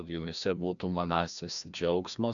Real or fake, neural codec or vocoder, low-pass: fake; codec, 16 kHz, 1 kbps, FreqCodec, larger model; 7.2 kHz